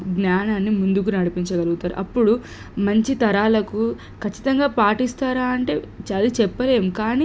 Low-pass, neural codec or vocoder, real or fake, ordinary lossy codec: none; none; real; none